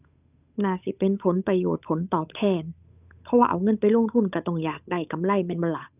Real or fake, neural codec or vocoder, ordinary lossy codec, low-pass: fake; codec, 16 kHz, 8 kbps, FunCodec, trained on Chinese and English, 25 frames a second; none; 3.6 kHz